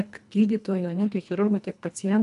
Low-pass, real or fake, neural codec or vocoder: 10.8 kHz; fake; codec, 24 kHz, 1.5 kbps, HILCodec